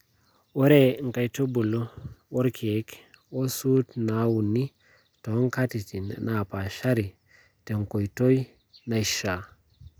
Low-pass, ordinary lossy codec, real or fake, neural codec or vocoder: none; none; real; none